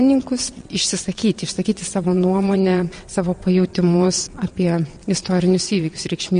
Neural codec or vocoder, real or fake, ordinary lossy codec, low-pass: vocoder, 22.05 kHz, 80 mel bands, WaveNeXt; fake; MP3, 48 kbps; 9.9 kHz